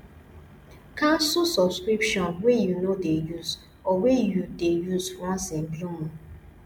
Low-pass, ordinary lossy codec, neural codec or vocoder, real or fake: 19.8 kHz; MP3, 96 kbps; none; real